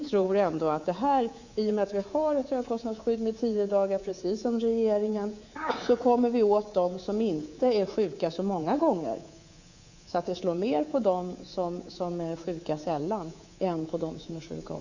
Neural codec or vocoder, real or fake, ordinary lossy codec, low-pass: codec, 24 kHz, 3.1 kbps, DualCodec; fake; Opus, 64 kbps; 7.2 kHz